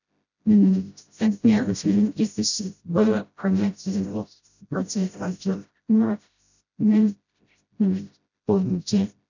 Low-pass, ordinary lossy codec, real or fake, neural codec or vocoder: 7.2 kHz; none; fake; codec, 16 kHz, 0.5 kbps, FreqCodec, smaller model